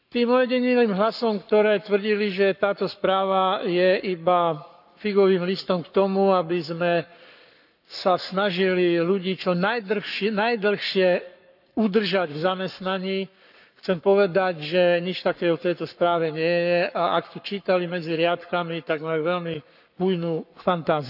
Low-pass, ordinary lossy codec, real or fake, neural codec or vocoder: 5.4 kHz; none; fake; codec, 44.1 kHz, 7.8 kbps, Pupu-Codec